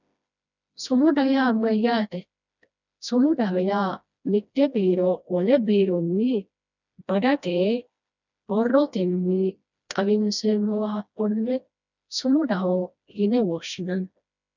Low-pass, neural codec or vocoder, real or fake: 7.2 kHz; codec, 16 kHz, 1 kbps, FreqCodec, smaller model; fake